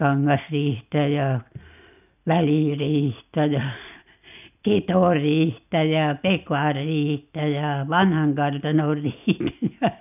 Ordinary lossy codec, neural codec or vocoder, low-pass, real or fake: none; none; 3.6 kHz; real